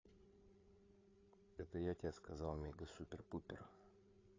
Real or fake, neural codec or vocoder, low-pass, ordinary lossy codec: fake; codec, 16 kHz, 8 kbps, FreqCodec, larger model; 7.2 kHz; AAC, 32 kbps